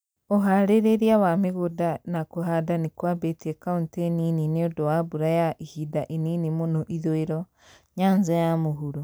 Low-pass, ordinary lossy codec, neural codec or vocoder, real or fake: none; none; none; real